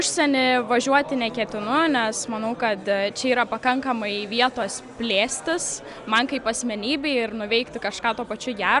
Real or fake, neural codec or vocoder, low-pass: real; none; 10.8 kHz